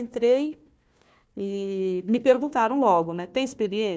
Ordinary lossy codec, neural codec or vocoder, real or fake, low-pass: none; codec, 16 kHz, 1 kbps, FunCodec, trained on Chinese and English, 50 frames a second; fake; none